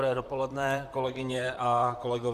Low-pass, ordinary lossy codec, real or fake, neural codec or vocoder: 14.4 kHz; Opus, 64 kbps; fake; vocoder, 44.1 kHz, 128 mel bands, Pupu-Vocoder